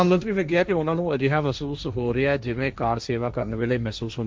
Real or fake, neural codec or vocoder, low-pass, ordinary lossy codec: fake; codec, 16 kHz, 1.1 kbps, Voila-Tokenizer; none; none